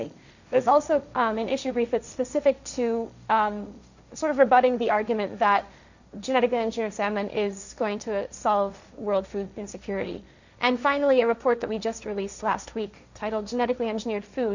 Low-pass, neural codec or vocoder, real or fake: 7.2 kHz; codec, 16 kHz, 1.1 kbps, Voila-Tokenizer; fake